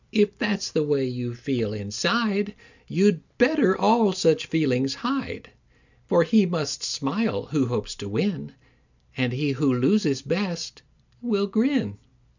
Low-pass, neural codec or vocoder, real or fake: 7.2 kHz; none; real